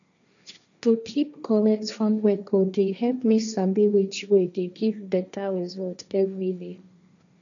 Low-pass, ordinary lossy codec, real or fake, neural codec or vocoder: 7.2 kHz; none; fake; codec, 16 kHz, 1.1 kbps, Voila-Tokenizer